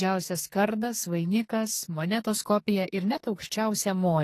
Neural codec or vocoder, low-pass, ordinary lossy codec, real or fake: codec, 44.1 kHz, 2.6 kbps, SNAC; 14.4 kHz; AAC, 48 kbps; fake